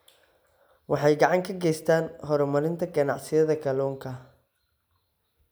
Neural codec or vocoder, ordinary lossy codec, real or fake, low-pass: none; none; real; none